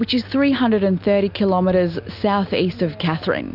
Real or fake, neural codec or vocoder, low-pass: real; none; 5.4 kHz